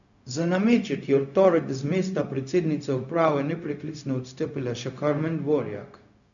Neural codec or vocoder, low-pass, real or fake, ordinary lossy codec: codec, 16 kHz, 0.4 kbps, LongCat-Audio-Codec; 7.2 kHz; fake; none